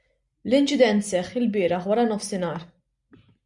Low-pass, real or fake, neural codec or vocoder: 10.8 kHz; real; none